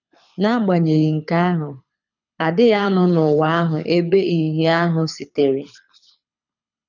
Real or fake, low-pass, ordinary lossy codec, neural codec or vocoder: fake; 7.2 kHz; none; codec, 24 kHz, 6 kbps, HILCodec